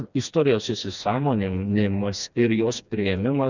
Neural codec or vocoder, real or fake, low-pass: codec, 16 kHz, 2 kbps, FreqCodec, smaller model; fake; 7.2 kHz